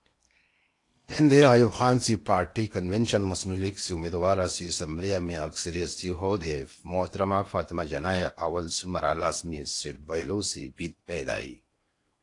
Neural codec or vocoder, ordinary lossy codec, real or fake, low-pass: codec, 16 kHz in and 24 kHz out, 0.8 kbps, FocalCodec, streaming, 65536 codes; AAC, 48 kbps; fake; 10.8 kHz